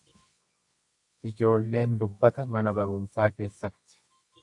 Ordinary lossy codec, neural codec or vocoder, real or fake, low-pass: AAC, 64 kbps; codec, 24 kHz, 0.9 kbps, WavTokenizer, medium music audio release; fake; 10.8 kHz